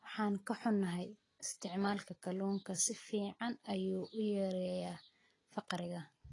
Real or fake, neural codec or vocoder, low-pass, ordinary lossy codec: real; none; 10.8 kHz; AAC, 32 kbps